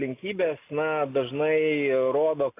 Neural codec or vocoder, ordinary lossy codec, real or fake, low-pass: none; AAC, 24 kbps; real; 3.6 kHz